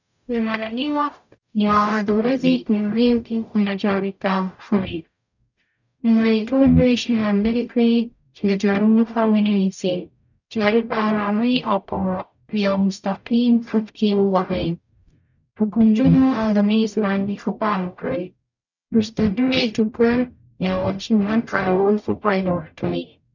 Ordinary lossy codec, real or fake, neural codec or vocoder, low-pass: none; fake; codec, 44.1 kHz, 0.9 kbps, DAC; 7.2 kHz